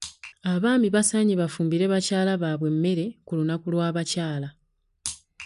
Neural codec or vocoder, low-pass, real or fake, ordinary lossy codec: none; 10.8 kHz; real; none